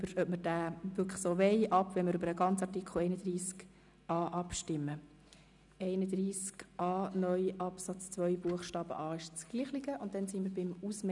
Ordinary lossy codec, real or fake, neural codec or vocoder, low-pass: none; real; none; 10.8 kHz